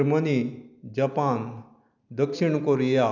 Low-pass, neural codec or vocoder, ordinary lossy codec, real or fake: 7.2 kHz; none; none; real